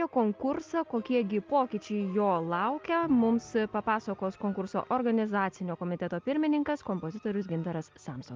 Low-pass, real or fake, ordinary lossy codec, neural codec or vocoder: 7.2 kHz; real; Opus, 24 kbps; none